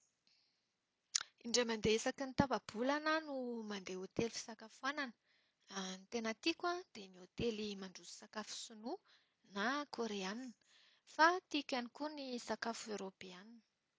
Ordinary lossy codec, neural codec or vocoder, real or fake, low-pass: none; none; real; none